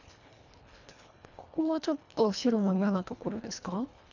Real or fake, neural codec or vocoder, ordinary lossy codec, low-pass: fake; codec, 24 kHz, 1.5 kbps, HILCodec; none; 7.2 kHz